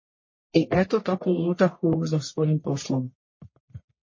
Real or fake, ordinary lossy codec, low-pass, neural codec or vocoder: fake; MP3, 32 kbps; 7.2 kHz; codec, 44.1 kHz, 1.7 kbps, Pupu-Codec